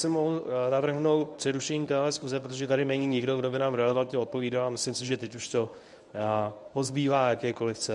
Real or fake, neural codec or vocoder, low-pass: fake; codec, 24 kHz, 0.9 kbps, WavTokenizer, medium speech release version 1; 10.8 kHz